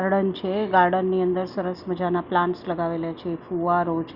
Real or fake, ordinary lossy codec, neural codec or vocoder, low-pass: real; Opus, 64 kbps; none; 5.4 kHz